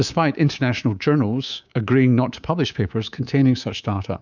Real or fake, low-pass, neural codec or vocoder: fake; 7.2 kHz; codec, 24 kHz, 3.1 kbps, DualCodec